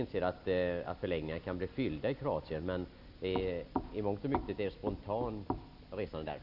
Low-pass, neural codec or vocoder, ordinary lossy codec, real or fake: 5.4 kHz; none; none; real